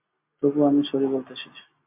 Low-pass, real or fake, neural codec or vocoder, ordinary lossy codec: 3.6 kHz; real; none; MP3, 32 kbps